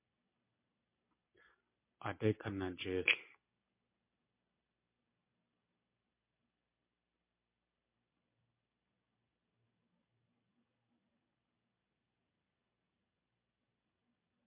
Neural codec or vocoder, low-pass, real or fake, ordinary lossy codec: none; 3.6 kHz; real; MP3, 24 kbps